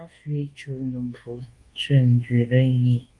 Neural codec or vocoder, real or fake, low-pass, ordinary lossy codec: codec, 24 kHz, 1.2 kbps, DualCodec; fake; 10.8 kHz; Opus, 24 kbps